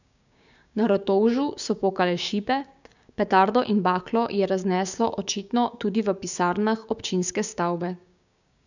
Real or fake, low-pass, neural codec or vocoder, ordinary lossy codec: fake; 7.2 kHz; codec, 16 kHz, 6 kbps, DAC; none